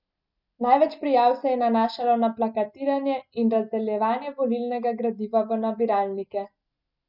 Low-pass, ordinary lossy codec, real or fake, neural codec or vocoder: 5.4 kHz; none; real; none